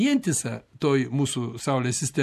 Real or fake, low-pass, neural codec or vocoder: real; 14.4 kHz; none